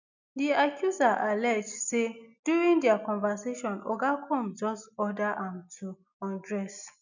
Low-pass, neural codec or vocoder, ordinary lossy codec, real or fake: 7.2 kHz; none; none; real